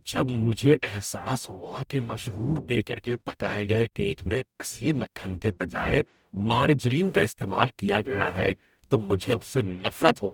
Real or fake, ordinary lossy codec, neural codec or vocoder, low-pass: fake; none; codec, 44.1 kHz, 0.9 kbps, DAC; 19.8 kHz